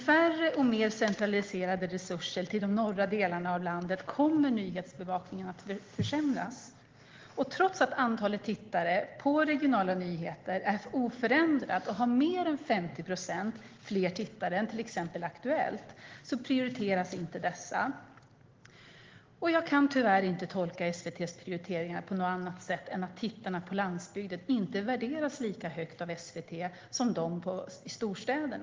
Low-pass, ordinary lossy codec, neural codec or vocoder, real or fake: 7.2 kHz; Opus, 16 kbps; none; real